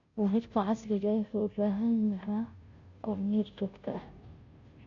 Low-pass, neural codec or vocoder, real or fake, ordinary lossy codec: 7.2 kHz; codec, 16 kHz, 0.5 kbps, FunCodec, trained on Chinese and English, 25 frames a second; fake; AAC, 48 kbps